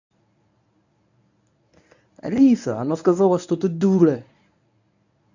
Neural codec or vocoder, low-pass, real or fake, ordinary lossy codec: codec, 24 kHz, 0.9 kbps, WavTokenizer, medium speech release version 1; 7.2 kHz; fake; none